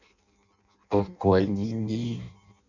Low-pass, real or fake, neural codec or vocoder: 7.2 kHz; fake; codec, 16 kHz in and 24 kHz out, 0.6 kbps, FireRedTTS-2 codec